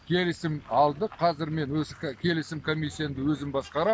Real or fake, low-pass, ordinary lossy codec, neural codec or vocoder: real; none; none; none